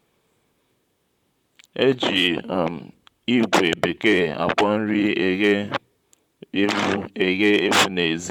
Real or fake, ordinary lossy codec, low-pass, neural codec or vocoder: fake; none; 19.8 kHz; vocoder, 44.1 kHz, 128 mel bands, Pupu-Vocoder